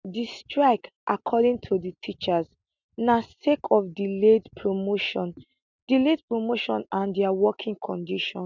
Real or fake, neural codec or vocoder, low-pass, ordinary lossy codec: real; none; 7.2 kHz; none